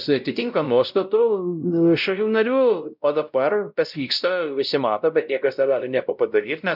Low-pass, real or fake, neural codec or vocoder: 5.4 kHz; fake; codec, 16 kHz, 0.5 kbps, X-Codec, WavLM features, trained on Multilingual LibriSpeech